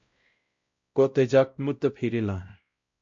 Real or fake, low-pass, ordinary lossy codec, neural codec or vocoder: fake; 7.2 kHz; MP3, 48 kbps; codec, 16 kHz, 0.5 kbps, X-Codec, WavLM features, trained on Multilingual LibriSpeech